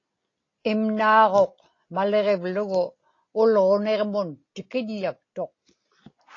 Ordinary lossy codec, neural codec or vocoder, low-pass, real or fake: AAC, 32 kbps; none; 7.2 kHz; real